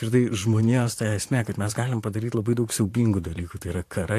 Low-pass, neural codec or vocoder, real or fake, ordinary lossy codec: 14.4 kHz; vocoder, 44.1 kHz, 128 mel bands, Pupu-Vocoder; fake; AAC, 64 kbps